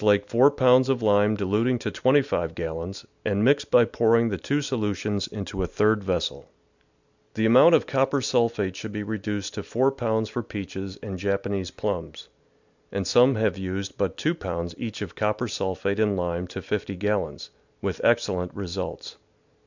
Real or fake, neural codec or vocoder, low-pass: real; none; 7.2 kHz